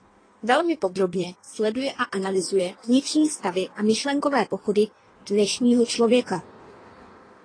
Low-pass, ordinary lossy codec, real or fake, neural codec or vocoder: 9.9 kHz; AAC, 32 kbps; fake; codec, 16 kHz in and 24 kHz out, 1.1 kbps, FireRedTTS-2 codec